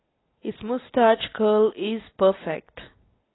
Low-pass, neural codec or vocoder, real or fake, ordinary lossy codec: 7.2 kHz; none; real; AAC, 16 kbps